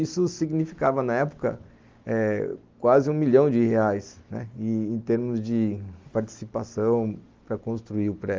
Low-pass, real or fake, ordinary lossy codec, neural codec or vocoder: 7.2 kHz; real; Opus, 32 kbps; none